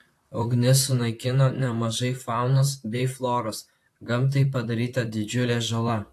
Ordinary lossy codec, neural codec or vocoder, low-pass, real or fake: AAC, 64 kbps; vocoder, 44.1 kHz, 128 mel bands, Pupu-Vocoder; 14.4 kHz; fake